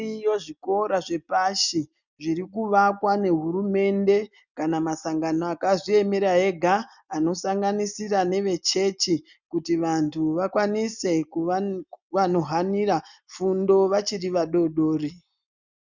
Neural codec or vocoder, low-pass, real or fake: none; 7.2 kHz; real